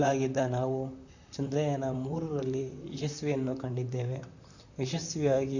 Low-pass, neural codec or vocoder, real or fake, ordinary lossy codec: 7.2 kHz; vocoder, 44.1 kHz, 128 mel bands, Pupu-Vocoder; fake; none